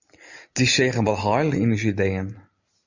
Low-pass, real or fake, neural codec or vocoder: 7.2 kHz; real; none